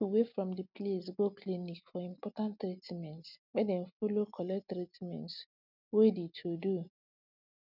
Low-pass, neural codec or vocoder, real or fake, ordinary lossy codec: 5.4 kHz; none; real; none